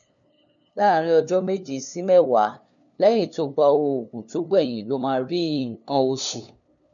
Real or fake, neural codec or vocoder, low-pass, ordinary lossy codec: fake; codec, 16 kHz, 2 kbps, FunCodec, trained on LibriTTS, 25 frames a second; 7.2 kHz; none